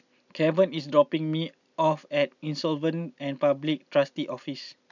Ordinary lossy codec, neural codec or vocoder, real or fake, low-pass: none; none; real; 7.2 kHz